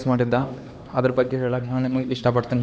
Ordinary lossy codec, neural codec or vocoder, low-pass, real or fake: none; codec, 16 kHz, 2 kbps, X-Codec, HuBERT features, trained on LibriSpeech; none; fake